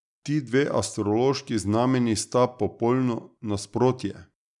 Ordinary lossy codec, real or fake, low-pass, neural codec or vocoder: none; real; 10.8 kHz; none